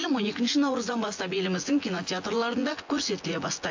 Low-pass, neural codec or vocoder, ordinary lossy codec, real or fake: 7.2 kHz; vocoder, 24 kHz, 100 mel bands, Vocos; AAC, 48 kbps; fake